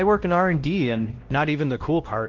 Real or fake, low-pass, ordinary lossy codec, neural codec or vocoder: fake; 7.2 kHz; Opus, 16 kbps; codec, 16 kHz, 1 kbps, X-Codec, WavLM features, trained on Multilingual LibriSpeech